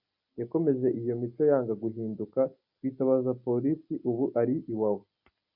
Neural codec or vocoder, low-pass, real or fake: none; 5.4 kHz; real